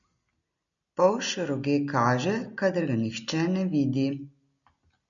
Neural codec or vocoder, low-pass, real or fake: none; 7.2 kHz; real